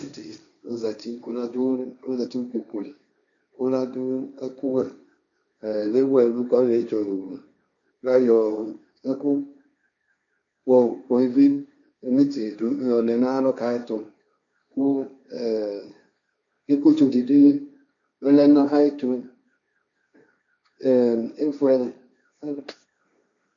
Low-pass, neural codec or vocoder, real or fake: 7.2 kHz; codec, 16 kHz, 1.1 kbps, Voila-Tokenizer; fake